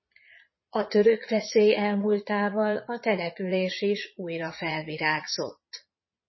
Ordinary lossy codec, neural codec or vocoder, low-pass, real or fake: MP3, 24 kbps; vocoder, 22.05 kHz, 80 mel bands, Vocos; 7.2 kHz; fake